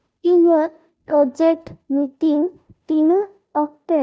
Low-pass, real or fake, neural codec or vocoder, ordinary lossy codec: none; fake; codec, 16 kHz, 0.5 kbps, FunCodec, trained on Chinese and English, 25 frames a second; none